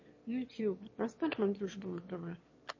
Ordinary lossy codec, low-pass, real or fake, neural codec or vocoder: MP3, 32 kbps; 7.2 kHz; fake; autoencoder, 22.05 kHz, a latent of 192 numbers a frame, VITS, trained on one speaker